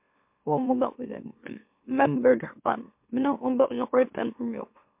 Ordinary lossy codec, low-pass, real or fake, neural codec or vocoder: MP3, 32 kbps; 3.6 kHz; fake; autoencoder, 44.1 kHz, a latent of 192 numbers a frame, MeloTTS